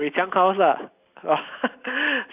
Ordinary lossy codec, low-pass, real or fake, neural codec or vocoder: none; 3.6 kHz; real; none